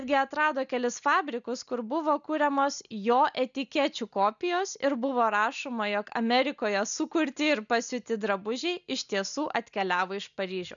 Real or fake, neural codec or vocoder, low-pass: real; none; 7.2 kHz